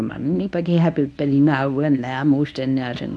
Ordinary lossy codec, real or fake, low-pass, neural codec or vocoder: none; fake; none; codec, 24 kHz, 0.9 kbps, WavTokenizer, medium speech release version 1